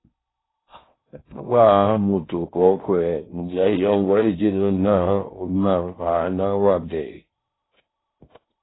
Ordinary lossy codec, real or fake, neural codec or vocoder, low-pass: AAC, 16 kbps; fake; codec, 16 kHz in and 24 kHz out, 0.6 kbps, FocalCodec, streaming, 4096 codes; 7.2 kHz